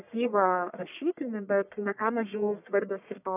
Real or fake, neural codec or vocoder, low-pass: fake; codec, 44.1 kHz, 1.7 kbps, Pupu-Codec; 3.6 kHz